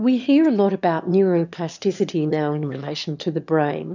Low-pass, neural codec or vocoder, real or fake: 7.2 kHz; autoencoder, 22.05 kHz, a latent of 192 numbers a frame, VITS, trained on one speaker; fake